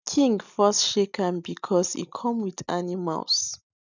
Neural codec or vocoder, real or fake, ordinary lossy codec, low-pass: none; real; none; 7.2 kHz